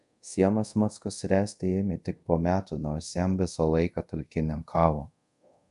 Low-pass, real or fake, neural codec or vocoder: 10.8 kHz; fake; codec, 24 kHz, 0.5 kbps, DualCodec